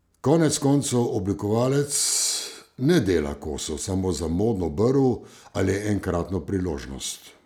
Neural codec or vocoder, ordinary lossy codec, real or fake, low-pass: none; none; real; none